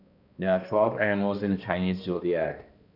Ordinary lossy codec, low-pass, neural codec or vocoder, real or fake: none; 5.4 kHz; codec, 16 kHz, 1 kbps, X-Codec, HuBERT features, trained on balanced general audio; fake